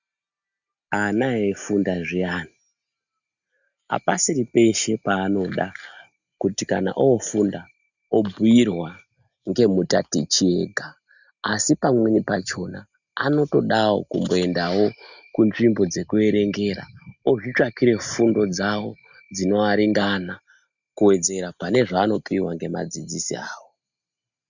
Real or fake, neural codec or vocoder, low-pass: real; none; 7.2 kHz